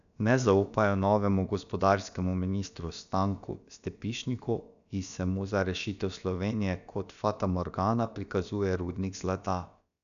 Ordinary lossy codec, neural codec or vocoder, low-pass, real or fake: none; codec, 16 kHz, about 1 kbps, DyCAST, with the encoder's durations; 7.2 kHz; fake